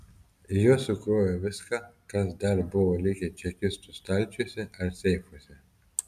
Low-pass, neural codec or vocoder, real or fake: 14.4 kHz; none; real